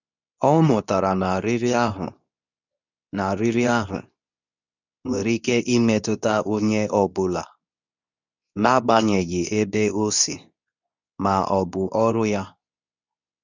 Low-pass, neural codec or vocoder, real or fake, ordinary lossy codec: 7.2 kHz; codec, 24 kHz, 0.9 kbps, WavTokenizer, medium speech release version 2; fake; none